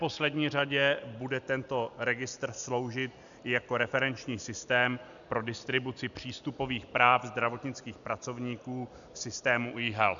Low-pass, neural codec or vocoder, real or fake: 7.2 kHz; none; real